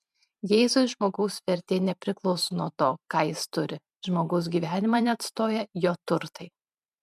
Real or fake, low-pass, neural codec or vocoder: fake; 14.4 kHz; vocoder, 44.1 kHz, 128 mel bands every 512 samples, BigVGAN v2